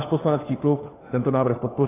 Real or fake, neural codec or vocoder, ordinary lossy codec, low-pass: fake; codec, 16 kHz, 4 kbps, FunCodec, trained on LibriTTS, 50 frames a second; AAC, 16 kbps; 3.6 kHz